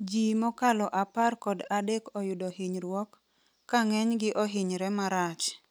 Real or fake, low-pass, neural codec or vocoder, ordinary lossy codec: real; none; none; none